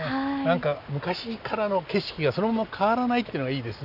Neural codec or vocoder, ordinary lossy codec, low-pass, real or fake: none; none; 5.4 kHz; real